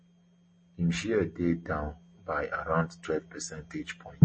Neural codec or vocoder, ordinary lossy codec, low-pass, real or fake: none; MP3, 32 kbps; 9.9 kHz; real